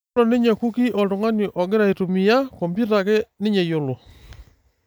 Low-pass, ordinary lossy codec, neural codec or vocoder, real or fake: none; none; none; real